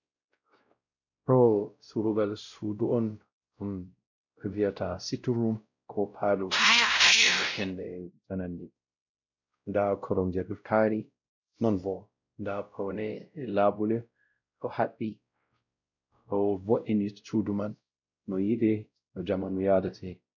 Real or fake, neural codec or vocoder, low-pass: fake; codec, 16 kHz, 0.5 kbps, X-Codec, WavLM features, trained on Multilingual LibriSpeech; 7.2 kHz